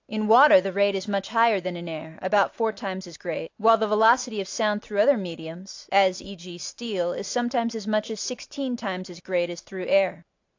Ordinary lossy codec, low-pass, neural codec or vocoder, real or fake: AAC, 48 kbps; 7.2 kHz; none; real